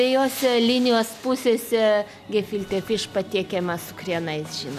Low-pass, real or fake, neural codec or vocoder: 14.4 kHz; real; none